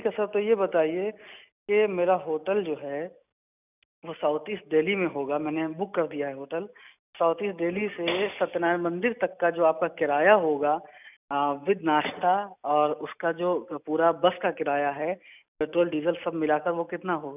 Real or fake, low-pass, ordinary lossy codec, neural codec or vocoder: real; 3.6 kHz; none; none